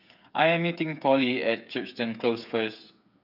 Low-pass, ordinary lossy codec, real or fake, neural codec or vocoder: 5.4 kHz; none; fake; codec, 16 kHz, 8 kbps, FreqCodec, smaller model